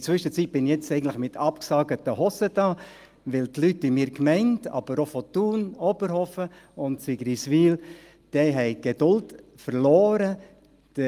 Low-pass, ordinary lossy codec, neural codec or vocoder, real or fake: 14.4 kHz; Opus, 32 kbps; none; real